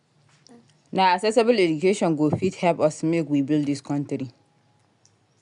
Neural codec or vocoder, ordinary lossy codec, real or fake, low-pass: none; none; real; 10.8 kHz